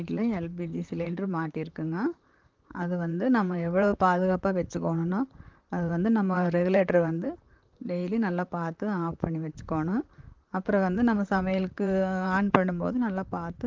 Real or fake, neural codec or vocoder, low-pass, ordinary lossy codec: fake; vocoder, 44.1 kHz, 128 mel bands, Pupu-Vocoder; 7.2 kHz; Opus, 32 kbps